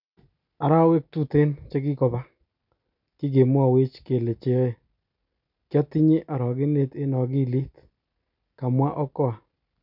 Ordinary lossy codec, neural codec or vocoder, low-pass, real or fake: none; none; 5.4 kHz; real